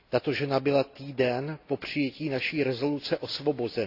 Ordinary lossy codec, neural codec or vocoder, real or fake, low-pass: AAC, 32 kbps; none; real; 5.4 kHz